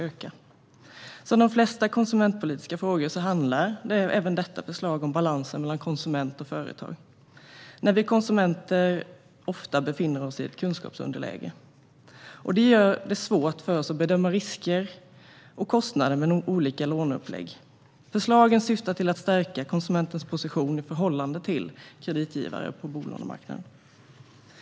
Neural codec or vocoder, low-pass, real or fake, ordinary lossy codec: none; none; real; none